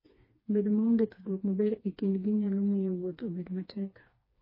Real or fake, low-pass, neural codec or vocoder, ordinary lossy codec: fake; 5.4 kHz; codec, 16 kHz, 2 kbps, FreqCodec, smaller model; MP3, 24 kbps